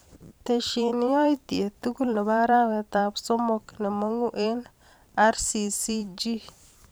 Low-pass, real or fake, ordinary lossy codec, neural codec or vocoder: none; fake; none; vocoder, 44.1 kHz, 128 mel bands every 512 samples, BigVGAN v2